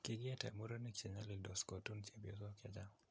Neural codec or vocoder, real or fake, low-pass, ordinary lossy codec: none; real; none; none